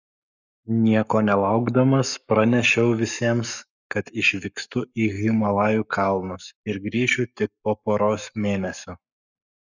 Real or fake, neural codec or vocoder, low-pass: fake; codec, 44.1 kHz, 7.8 kbps, Pupu-Codec; 7.2 kHz